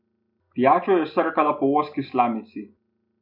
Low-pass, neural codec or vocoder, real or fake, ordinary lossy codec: 5.4 kHz; none; real; none